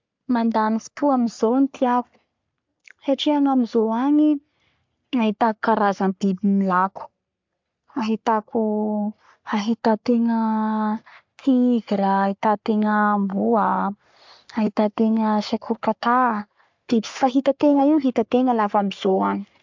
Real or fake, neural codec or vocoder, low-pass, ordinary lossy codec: fake; codec, 44.1 kHz, 3.4 kbps, Pupu-Codec; 7.2 kHz; MP3, 64 kbps